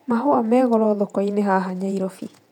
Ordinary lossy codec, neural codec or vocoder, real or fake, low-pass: none; vocoder, 48 kHz, 128 mel bands, Vocos; fake; 19.8 kHz